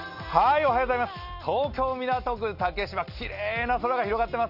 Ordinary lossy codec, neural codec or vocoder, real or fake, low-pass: none; none; real; 5.4 kHz